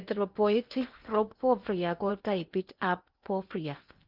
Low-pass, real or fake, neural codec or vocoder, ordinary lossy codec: 5.4 kHz; fake; codec, 16 kHz in and 24 kHz out, 0.8 kbps, FocalCodec, streaming, 65536 codes; Opus, 24 kbps